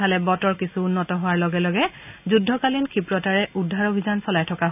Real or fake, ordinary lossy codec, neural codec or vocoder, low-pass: real; none; none; 3.6 kHz